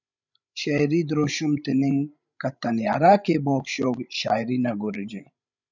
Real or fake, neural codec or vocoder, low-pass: fake; codec, 16 kHz, 16 kbps, FreqCodec, larger model; 7.2 kHz